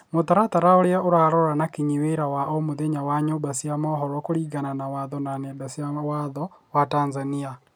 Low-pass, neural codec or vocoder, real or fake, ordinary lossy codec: none; none; real; none